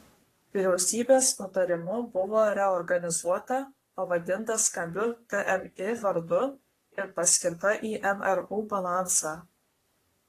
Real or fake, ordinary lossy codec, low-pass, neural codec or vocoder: fake; AAC, 48 kbps; 14.4 kHz; codec, 44.1 kHz, 3.4 kbps, Pupu-Codec